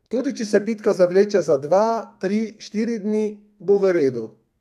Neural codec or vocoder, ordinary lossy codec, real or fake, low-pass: codec, 32 kHz, 1.9 kbps, SNAC; none; fake; 14.4 kHz